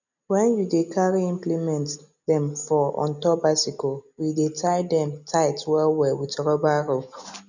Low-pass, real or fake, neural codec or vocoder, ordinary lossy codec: 7.2 kHz; real; none; none